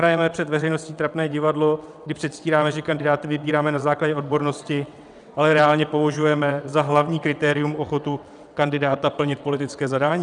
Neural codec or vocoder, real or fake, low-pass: vocoder, 22.05 kHz, 80 mel bands, WaveNeXt; fake; 9.9 kHz